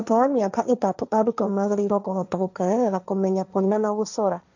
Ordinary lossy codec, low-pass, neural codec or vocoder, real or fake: none; 7.2 kHz; codec, 16 kHz, 1.1 kbps, Voila-Tokenizer; fake